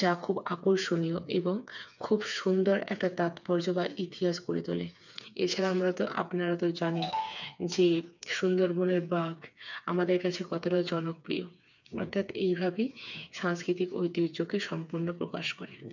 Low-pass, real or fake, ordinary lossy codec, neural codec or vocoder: 7.2 kHz; fake; none; codec, 16 kHz, 4 kbps, FreqCodec, smaller model